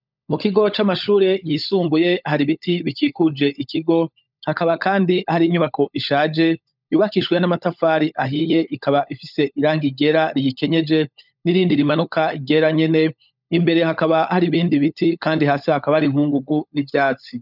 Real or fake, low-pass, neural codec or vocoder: fake; 5.4 kHz; codec, 16 kHz, 16 kbps, FunCodec, trained on LibriTTS, 50 frames a second